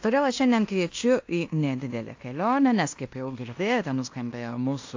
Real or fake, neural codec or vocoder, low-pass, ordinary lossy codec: fake; codec, 16 kHz in and 24 kHz out, 0.9 kbps, LongCat-Audio-Codec, fine tuned four codebook decoder; 7.2 kHz; AAC, 48 kbps